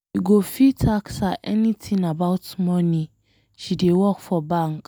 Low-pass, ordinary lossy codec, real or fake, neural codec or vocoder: none; none; real; none